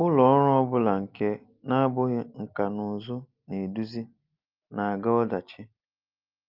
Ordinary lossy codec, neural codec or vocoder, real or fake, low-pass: Opus, 24 kbps; none; real; 5.4 kHz